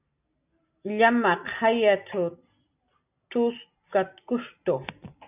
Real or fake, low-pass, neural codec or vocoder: real; 3.6 kHz; none